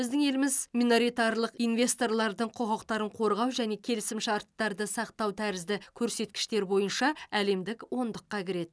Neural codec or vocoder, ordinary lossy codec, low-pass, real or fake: none; none; none; real